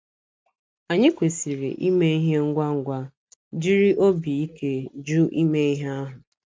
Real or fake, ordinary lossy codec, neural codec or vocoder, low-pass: real; none; none; none